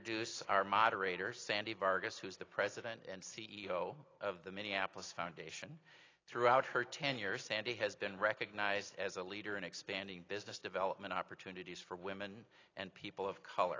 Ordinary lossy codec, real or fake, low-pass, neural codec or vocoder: AAC, 32 kbps; real; 7.2 kHz; none